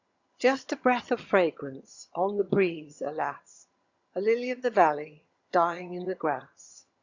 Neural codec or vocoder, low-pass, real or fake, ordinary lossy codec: vocoder, 22.05 kHz, 80 mel bands, HiFi-GAN; 7.2 kHz; fake; Opus, 64 kbps